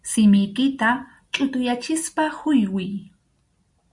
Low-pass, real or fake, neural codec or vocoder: 10.8 kHz; real; none